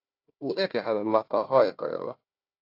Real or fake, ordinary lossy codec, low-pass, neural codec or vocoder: fake; AAC, 32 kbps; 5.4 kHz; codec, 16 kHz, 1 kbps, FunCodec, trained on Chinese and English, 50 frames a second